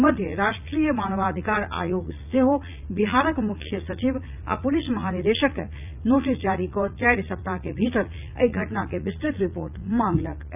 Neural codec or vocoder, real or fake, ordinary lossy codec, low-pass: vocoder, 44.1 kHz, 80 mel bands, Vocos; fake; none; 3.6 kHz